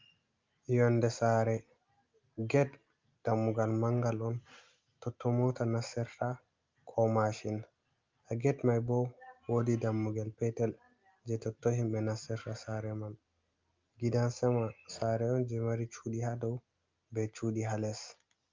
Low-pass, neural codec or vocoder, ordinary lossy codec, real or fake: 7.2 kHz; none; Opus, 24 kbps; real